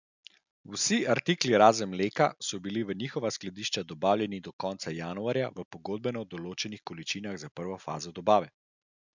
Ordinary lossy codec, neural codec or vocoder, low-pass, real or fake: none; none; 7.2 kHz; real